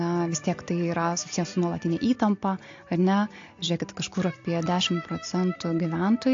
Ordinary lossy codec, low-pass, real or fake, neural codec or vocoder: AAC, 48 kbps; 7.2 kHz; real; none